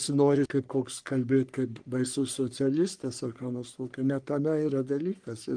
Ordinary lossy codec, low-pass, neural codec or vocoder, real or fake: Opus, 32 kbps; 9.9 kHz; codec, 44.1 kHz, 3.4 kbps, Pupu-Codec; fake